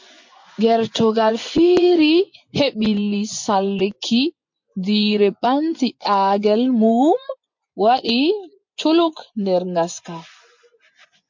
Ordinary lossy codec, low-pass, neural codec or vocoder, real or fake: MP3, 48 kbps; 7.2 kHz; vocoder, 24 kHz, 100 mel bands, Vocos; fake